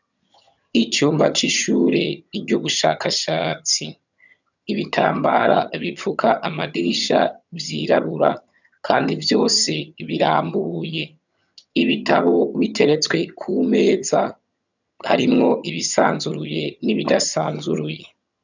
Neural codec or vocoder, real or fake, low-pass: vocoder, 22.05 kHz, 80 mel bands, HiFi-GAN; fake; 7.2 kHz